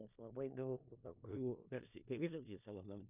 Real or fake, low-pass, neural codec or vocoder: fake; 3.6 kHz; codec, 16 kHz in and 24 kHz out, 0.4 kbps, LongCat-Audio-Codec, four codebook decoder